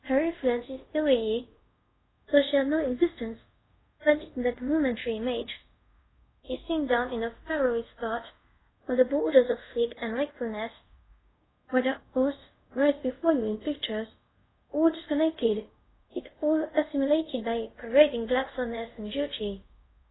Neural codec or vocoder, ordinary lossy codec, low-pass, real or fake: codec, 24 kHz, 0.5 kbps, DualCodec; AAC, 16 kbps; 7.2 kHz; fake